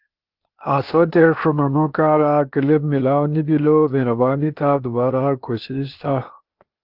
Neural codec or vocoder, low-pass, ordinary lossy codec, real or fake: codec, 16 kHz, 0.8 kbps, ZipCodec; 5.4 kHz; Opus, 24 kbps; fake